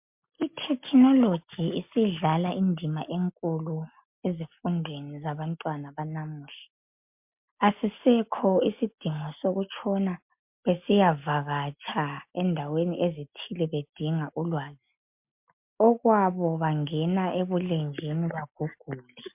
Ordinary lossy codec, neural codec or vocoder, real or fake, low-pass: MP3, 32 kbps; none; real; 3.6 kHz